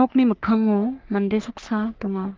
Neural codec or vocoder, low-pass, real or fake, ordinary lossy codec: codec, 44.1 kHz, 3.4 kbps, Pupu-Codec; 7.2 kHz; fake; Opus, 32 kbps